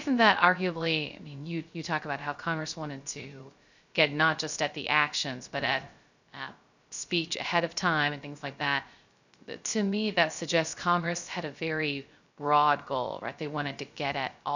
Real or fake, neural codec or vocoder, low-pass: fake; codec, 16 kHz, 0.3 kbps, FocalCodec; 7.2 kHz